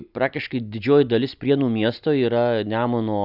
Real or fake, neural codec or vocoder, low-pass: real; none; 5.4 kHz